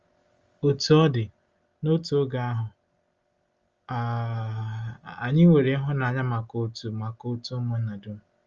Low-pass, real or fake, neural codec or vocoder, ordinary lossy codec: 7.2 kHz; real; none; Opus, 32 kbps